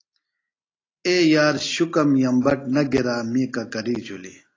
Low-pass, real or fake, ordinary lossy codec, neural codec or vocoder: 7.2 kHz; real; AAC, 32 kbps; none